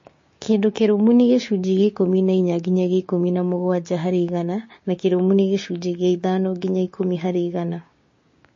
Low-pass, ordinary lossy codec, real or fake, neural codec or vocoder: 7.2 kHz; MP3, 32 kbps; fake; codec, 16 kHz, 6 kbps, DAC